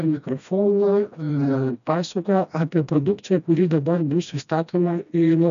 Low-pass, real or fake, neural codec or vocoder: 7.2 kHz; fake; codec, 16 kHz, 1 kbps, FreqCodec, smaller model